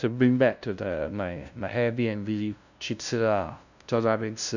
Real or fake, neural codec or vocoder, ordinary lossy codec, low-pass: fake; codec, 16 kHz, 0.5 kbps, FunCodec, trained on LibriTTS, 25 frames a second; none; 7.2 kHz